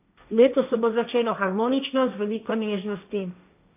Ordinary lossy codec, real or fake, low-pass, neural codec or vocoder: none; fake; 3.6 kHz; codec, 16 kHz, 1.1 kbps, Voila-Tokenizer